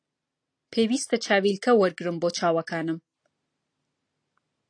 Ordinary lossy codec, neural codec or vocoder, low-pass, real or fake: AAC, 48 kbps; none; 9.9 kHz; real